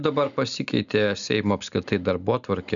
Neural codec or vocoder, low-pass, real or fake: none; 7.2 kHz; real